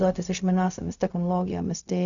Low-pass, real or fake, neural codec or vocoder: 7.2 kHz; fake; codec, 16 kHz, 0.4 kbps, LongCat-Audio-Codec